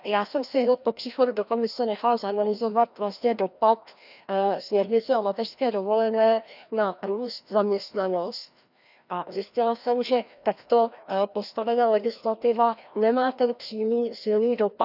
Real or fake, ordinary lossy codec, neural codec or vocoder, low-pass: fake; none; codec, 16 kHz, 1 kbps, FreqCodec, larger model; 5.4 kHz